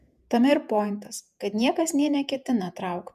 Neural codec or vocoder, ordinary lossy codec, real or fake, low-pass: vocoder, 48 kHz, 128 mel bands, Vocos; MP3, 96 kbps; fake; 14.4 kHz